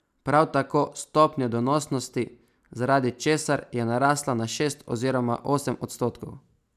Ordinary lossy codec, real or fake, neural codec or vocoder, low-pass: none; real; none; 14.4 kHz